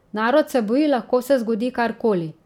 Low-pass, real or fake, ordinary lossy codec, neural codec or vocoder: 19.8 kHz; real; none; none